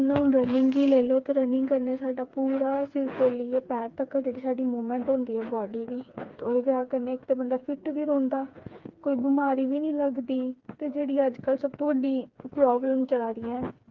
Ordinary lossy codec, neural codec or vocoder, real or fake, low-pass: Opus, 32 kbps; codec, 16 kHz, 4 kbps, FreqCodec, smaller model; fake; 7.2 kHz